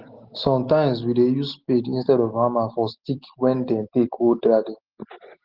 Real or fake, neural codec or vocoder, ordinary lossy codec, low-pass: real; none; Opus, 16 kbps; 5.4 kHz